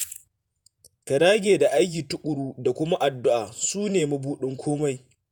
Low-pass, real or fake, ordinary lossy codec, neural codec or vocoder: none; fake; none; vocoder, 48 kHz, 128 mel bands, Vocos